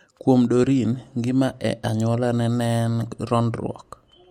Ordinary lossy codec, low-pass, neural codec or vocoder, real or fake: MP3, 64 kbps; 19.8 kHz; none; real